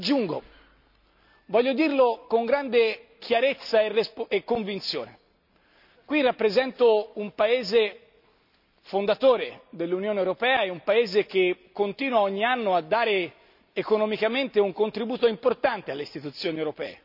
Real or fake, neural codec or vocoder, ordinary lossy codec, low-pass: real; none; none; 5.4 kHz